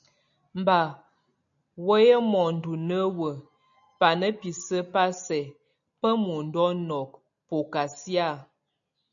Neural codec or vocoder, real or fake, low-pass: none; real; 7.2 kHz